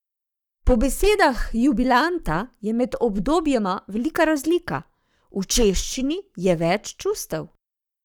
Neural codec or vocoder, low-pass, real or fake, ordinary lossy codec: codec, 44.1 kHz, 7.8 kbps, Pupu-Codec; 19.8 kHz; fake; none